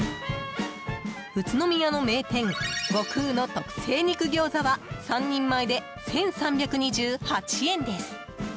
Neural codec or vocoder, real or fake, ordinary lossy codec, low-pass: none; real; none; none